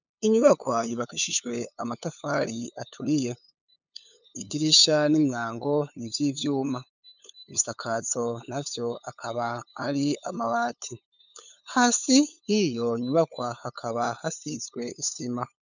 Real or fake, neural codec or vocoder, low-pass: fake; codec, 16 kHz, 8 kbps, FunCodec, trained on LibriTTS, 25 frames a second; 7.2 kHz